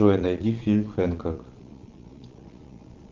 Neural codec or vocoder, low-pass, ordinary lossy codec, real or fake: vocoder, 22.05 kHz, 80 mel bands, Vocos; 7.2 kHz; Opus, 32 kbps; fake